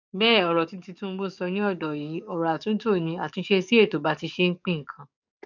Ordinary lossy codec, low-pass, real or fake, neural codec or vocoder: none; 7.2 kHz; fake; codec, 44.1 kHz, 7.8 kbps, DAC